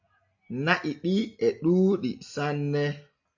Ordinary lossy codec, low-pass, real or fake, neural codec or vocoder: AAC, 48 kbps; 7.2 kHz; real; none